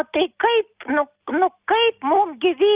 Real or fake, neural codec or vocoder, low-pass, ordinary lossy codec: real; none; 3.6 kHz; Opus, 24 kbps